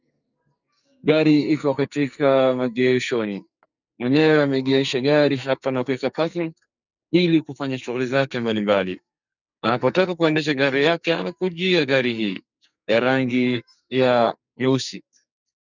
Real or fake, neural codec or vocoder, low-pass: fake; codec, 44.1 kHz, 2.6 kbps, SNAC; 7.2 kHz